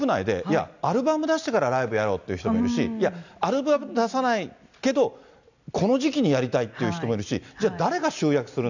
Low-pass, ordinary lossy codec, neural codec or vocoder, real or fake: 7.2 kHz; none; none; real